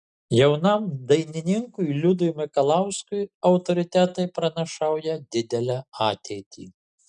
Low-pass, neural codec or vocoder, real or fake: 9.9 kHz; none; real